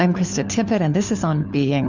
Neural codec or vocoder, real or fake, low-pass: codec, 16 kHz, 4 kbps, FunCodec, trained on LibriTTS, 50 frames a second; fake; 7.2 kHz